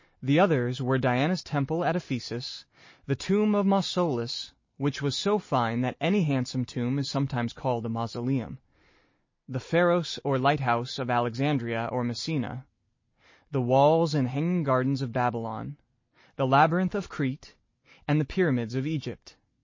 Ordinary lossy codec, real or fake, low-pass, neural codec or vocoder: MP3, 32 kbps; real; 7.2 kHz; none